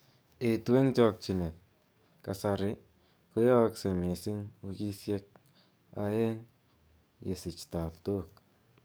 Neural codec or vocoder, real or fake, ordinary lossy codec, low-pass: codec, 44.1 kHz, 7.8 kbps, DAC; fake; none; none